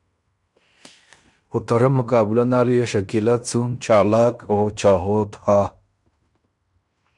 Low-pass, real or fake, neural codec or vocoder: 10.8 kHz; fake; codec, 16 kHz in and 24 kHz out, 0.9 kbps, LongCat-Audio-Codec, fine tuned four codebook decoder